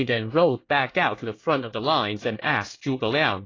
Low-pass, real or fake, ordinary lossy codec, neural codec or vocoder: 7.2 kHz; fake; AAC, 32 kbps; codec, 24 kHz, 1 kbps, SNAC